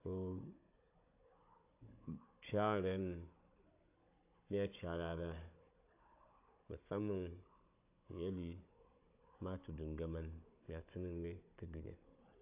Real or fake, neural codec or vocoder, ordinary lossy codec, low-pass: fake; codec, 16 kHz, 16 kbps, FunCodec, trained on Chinese and English, 50 frames a second; AAC, 32 kbps; 3.6 kHz